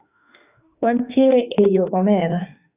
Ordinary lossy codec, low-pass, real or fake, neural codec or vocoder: Opus, 64 kbps; 3.6 kHz; fake; autoencoder, 48 kHz, 32 numbers a frame, DAC-VAE, trained on Japanese speech